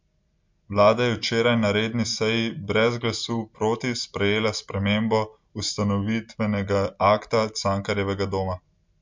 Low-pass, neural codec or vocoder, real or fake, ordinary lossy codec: 7.2 kHz; none; real; MP3, 64 kbps